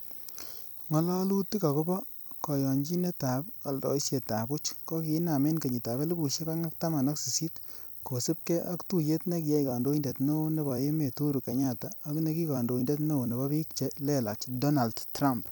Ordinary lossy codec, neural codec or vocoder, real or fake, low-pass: none; vocoder, 44.1 kHz, 128 mel bands every 256 samples, BigVGAN v2; fake; none